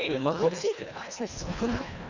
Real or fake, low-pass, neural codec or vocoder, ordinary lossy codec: fake; 7.2 kHz; codec, 24 kHz, 1.5 kbps, HILCodec; none